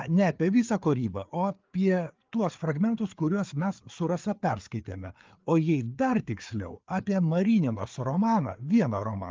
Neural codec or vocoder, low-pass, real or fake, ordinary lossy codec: codec, 16 kHz, 4 kbps, FreqCodec, larger model; 7.2 kHz; fake; Opus, 32 kbps